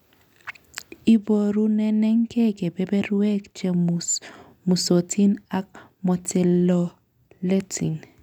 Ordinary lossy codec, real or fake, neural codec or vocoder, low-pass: none; real; none; 19.8 kHz